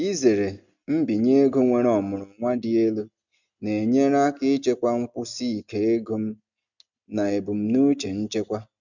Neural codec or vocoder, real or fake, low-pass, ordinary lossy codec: none; real; 7.2 kHz; none